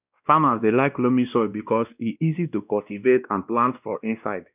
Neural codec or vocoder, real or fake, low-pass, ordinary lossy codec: codec, 16 kHz, 1 kbps, X-Codec, WavLM features, trained on Multilingual LibriSpeech; fake; 3.6 kHz; none